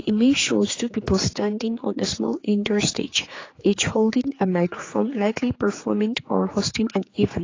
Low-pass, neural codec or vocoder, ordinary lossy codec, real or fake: 7.2 kHz; codec, 16 kHz, 4 kbps, X-Codec, HuBERT features, trained on general audio; AAC, 32 kbps; fake